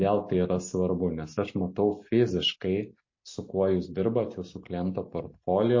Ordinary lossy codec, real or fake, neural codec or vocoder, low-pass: MP3, 32 kbps; real; none; 7.2 kHz